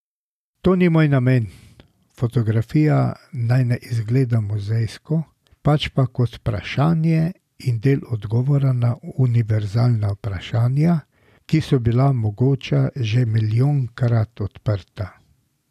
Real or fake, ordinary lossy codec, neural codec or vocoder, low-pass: real; none; none; 14.4 kHz